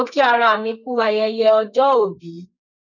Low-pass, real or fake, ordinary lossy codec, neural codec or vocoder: 7.2 kHz; fake; none; codec, 32 kHz, 1.9 kbps, SNAC